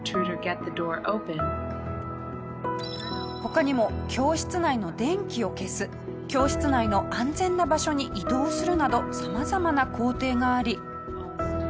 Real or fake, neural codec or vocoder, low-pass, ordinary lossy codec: real; none; none; none